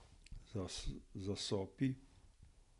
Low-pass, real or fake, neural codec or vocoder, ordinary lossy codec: 10.8 kHz; real; none; none